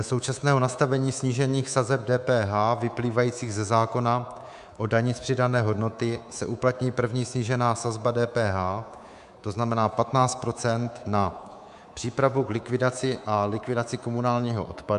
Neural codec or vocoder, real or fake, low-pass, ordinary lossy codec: codec, 24 kHz, 3.1 kbps, DualCodec; fake; 10.8 kHz; MP3, 96 kbps